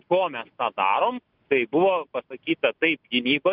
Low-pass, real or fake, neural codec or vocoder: 5.4 kHz; real; none